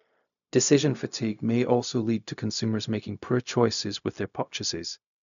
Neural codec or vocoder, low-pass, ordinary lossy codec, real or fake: codec, 16 kHz, 0.4 kbps, LongCat-Audio-Codec; 7.2 kHz; none; fake